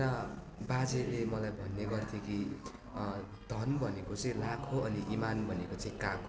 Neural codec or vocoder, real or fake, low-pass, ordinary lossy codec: none; real; none; none